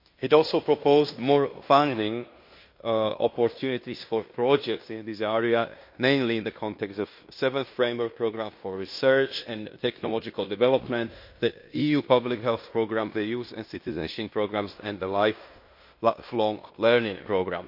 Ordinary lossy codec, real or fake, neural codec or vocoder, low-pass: MP3, 32 kbps; fake; codec, 16 kHz in and 24 kHz out, 0.9 kbps, LongCat-Audio-Codec, fine tuned four codebook decoder; 5.4 kHz